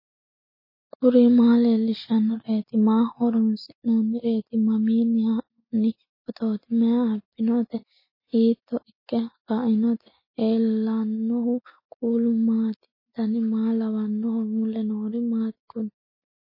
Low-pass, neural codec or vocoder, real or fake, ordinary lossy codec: 5.4 kHz; none; real; MP3, 24 kbps